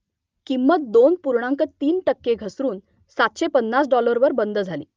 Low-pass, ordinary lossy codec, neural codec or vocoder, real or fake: 7.2 kHz; Opus, 24 kbps; none; real